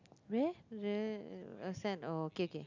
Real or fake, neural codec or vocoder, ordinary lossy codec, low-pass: real; none; none; 7.2 kHz